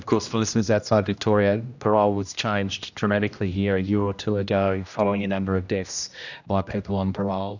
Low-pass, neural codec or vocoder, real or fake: 7.2 kHz; codec, 16 kHz, 1 kbps, X-Codec, HuBERT features, trained on general audio; fake